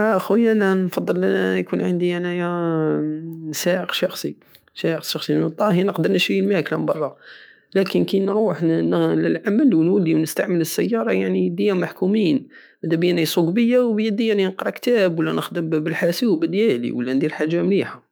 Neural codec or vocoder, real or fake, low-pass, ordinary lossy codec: autoencoder, 48 kHz, 128 numbers a frame, DAC-VAE, trained on Japanese speech; fake; none; none